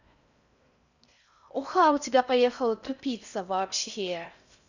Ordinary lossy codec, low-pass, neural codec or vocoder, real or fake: none; 7.2 kHz; codec, 16 kHz in and 24 kHz out, 0.6 kbps, FocalCodec, streaming, 4096 codes; fake